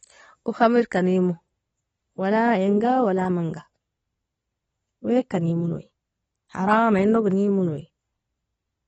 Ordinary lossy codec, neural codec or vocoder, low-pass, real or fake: AAC, 24 kbps; none; 19.8 kHz; real